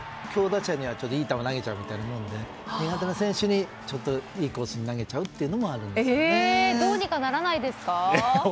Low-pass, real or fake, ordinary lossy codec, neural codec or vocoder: none; real; none; none